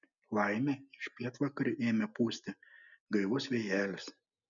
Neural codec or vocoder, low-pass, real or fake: none; 7.2 kHz; real